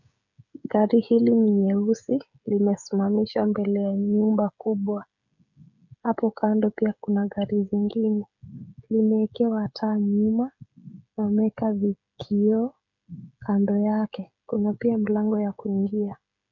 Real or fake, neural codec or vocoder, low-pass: fake; codec, 16 kHz, 16 kbps, FreqCodec, smaller model; 7.2 kHz